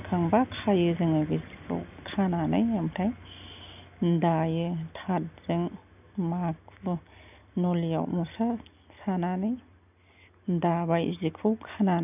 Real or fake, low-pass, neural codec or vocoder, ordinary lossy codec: real; 3.6 kHz; none; none